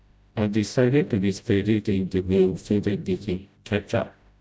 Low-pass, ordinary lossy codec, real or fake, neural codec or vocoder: none; none; fake; codec, 16 kHz, 0.5 kbps, FreqCodec, smaller model